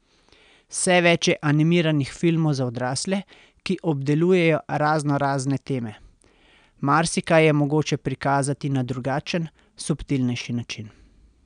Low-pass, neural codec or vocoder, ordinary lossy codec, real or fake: 9.9 kHz; none; none; real